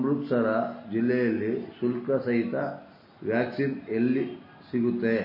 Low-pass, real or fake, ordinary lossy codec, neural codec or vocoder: 5.4 kHz; real; MP3, 24 kbps; none